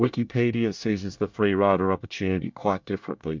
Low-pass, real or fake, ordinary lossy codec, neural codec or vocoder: 7.2 kHz; fake; MP3, 64 kbps; codec, 24 kHz, 1 kbps, SNAC